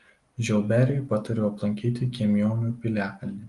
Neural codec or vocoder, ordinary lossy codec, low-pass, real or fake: none; Opus, 24 kbps; 10.8 kHz; real